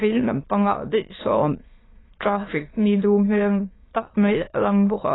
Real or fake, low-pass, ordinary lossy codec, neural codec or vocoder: fake; 7.2 kHz; AAC, 16 kbps; autoencoder, 22.05 kHz, a latent of 192 numbers a frame, VITS, trained on many speakers